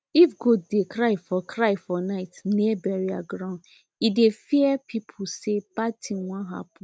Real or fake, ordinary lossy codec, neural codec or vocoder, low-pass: real; none; none; none